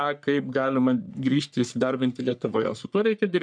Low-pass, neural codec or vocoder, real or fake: 9.9 kHz; codec, 44.1 kHz, 3.4 kbps, Pupu-Codec; fake